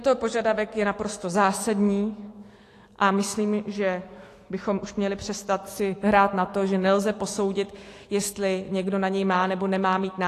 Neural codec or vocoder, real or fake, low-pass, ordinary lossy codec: autoencoder, 48 kHz, 128 numbers a frame, DAC-VAE, trained on Japanese speech; fake; 14.4 kHz; AAC, 48 kbps